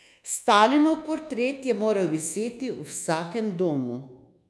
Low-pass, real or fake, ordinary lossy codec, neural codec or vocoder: none; fake; none; codec, 24 kHz, 1.2 kbps, DualCodec